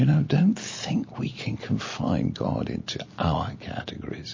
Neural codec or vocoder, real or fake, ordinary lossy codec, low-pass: none; real; MP3, 32 kbps; 7.2 kHz